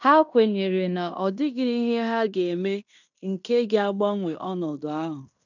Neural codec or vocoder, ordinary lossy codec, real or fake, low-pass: codec, 16 kHz in and 24 kHz out, 0.9 kbps, LongCat-Audio-Codec, fine tuned four codebook decoder; none; fake; 7.2 kHz